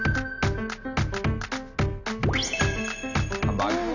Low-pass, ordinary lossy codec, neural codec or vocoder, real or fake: 7.2 kHz; none; none; real